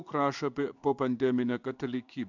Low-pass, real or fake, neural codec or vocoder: 7.2 kHz; fake; codec, 16 kHz in and 24 kHz out, 1 kbps, XY-Tokenizer